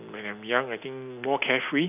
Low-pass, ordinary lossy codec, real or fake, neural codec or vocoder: 3.6 kHz; none; real; none